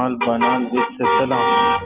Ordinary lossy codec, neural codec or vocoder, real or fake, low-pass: Opus, 24 kbps; none; real; 3.6 kHz